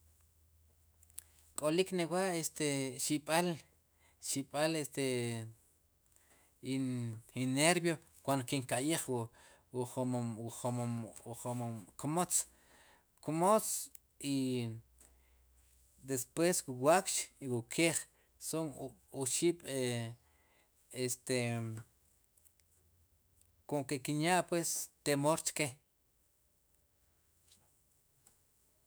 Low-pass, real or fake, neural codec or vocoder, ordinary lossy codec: none; fake; autoencoder, 48 kHz, 128 numbers a frame, DAC-VAE, trained on Japanese speech; none